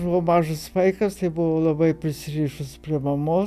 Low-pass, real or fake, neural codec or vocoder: 14.4 kHz; real; none